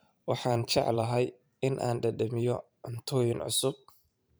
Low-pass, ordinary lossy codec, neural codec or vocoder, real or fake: none; none; vocoder, 44.1 kHz, 128 mel bands every 512 samples, BigVGAN v2; fake